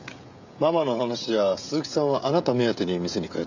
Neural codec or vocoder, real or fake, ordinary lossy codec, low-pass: codec, 16 kHz, 16 kbps, FreqCodec, smaller model; fake; none; 7.2 kHz